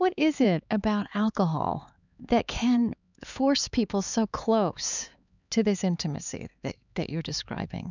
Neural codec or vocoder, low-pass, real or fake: codec, 16 kHz, 4 kbps, X-Codec, HuBERT features, trained on LibriSpeech; 7.2 kHz; fake